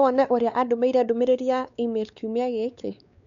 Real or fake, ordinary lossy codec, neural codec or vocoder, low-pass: fake; none; codec, 16 kHz, 4 kbps, X-Codec, WavLM features, trained on Multilingual LibriSpeech; 7.2 kHz